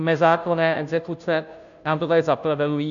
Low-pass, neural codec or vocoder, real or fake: 7.2 kHz; codec, 16 kHz, 0.5 kbps, FunCodec, trained on Chinese and English, 25 frames a second; fake